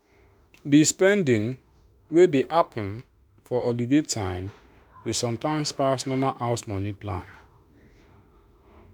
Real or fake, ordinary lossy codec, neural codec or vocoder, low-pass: fake; none; autoencoder, 48 kHz, 32 numbers a frame, DAC-VAE, trained on Japanese speech; none